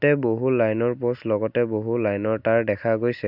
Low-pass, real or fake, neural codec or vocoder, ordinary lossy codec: 5.4 kHz; real; none; none